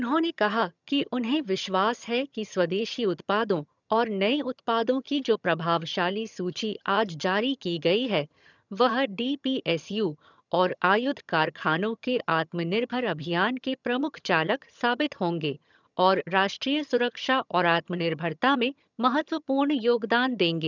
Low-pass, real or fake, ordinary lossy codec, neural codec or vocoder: 7.2 kHz; fake; none; vocoder, 22.05 kHz, 80 mel bands, HiFi-GAN